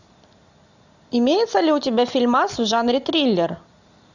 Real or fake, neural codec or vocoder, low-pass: real; none; 7.2 kHz